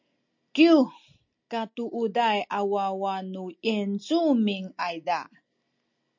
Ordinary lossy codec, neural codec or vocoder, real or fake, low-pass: MP3, 48 kbps; none; real; 7.2 kHz